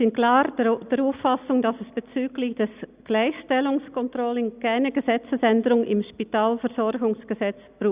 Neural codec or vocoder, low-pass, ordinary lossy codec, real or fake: none; 3.6 kHz; Opus, 64 kbps; real